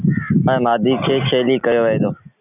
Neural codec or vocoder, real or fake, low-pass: none; real; 3.6 kHz